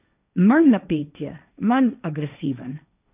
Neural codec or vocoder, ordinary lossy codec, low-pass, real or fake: codec, 16 kHz, 1.1 kbps, Voila-Tokenizer; none; 3.6 kHz; fake